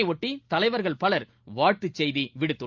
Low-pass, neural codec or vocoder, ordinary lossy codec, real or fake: 7.2 kHz; none; Opus, 32 kbps; real